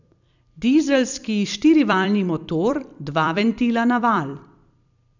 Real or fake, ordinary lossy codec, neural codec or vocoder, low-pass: fake; none; vocoder, 44.1 kHz, 128 mel bands every 256 samples, BigVGAN v2; 7.2 kHz